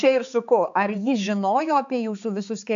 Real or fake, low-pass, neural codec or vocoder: fake; 7.2 kHz; codec, 16 kHz, 4 kbps, X-Codec, HuBERT features, trained on balanced general audio